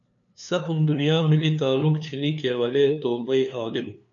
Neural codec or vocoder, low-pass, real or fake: codec, 16 kHz, 2 kbps, FunCodec, trained on LibriTTS, 25 frames a second; 7.2 kHz; fake